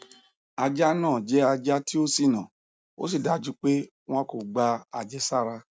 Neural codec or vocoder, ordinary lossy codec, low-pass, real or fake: none; none; none; real